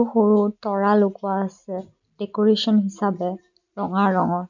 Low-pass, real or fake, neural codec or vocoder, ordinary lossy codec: 7.2 kHz; real; none; none